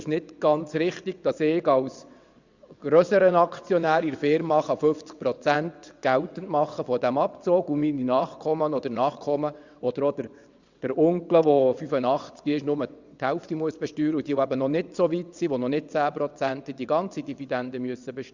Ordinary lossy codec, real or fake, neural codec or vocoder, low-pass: Opus, 64 kbps; fake; vocoder, 22.05 kHz, 80 mel bands, WaveNeXt; 7.2 kHz